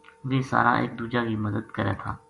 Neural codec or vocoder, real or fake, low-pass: none; real; 10.8 kHz